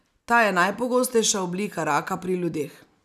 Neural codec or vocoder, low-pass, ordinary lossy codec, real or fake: none; 14.4 kHz; none; real